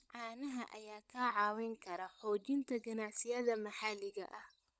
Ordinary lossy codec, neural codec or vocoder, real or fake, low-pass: none; codec, 16 kHz, 16 kbps, FunCodec, trained on LibriTTS, 50 frames a second; fake; none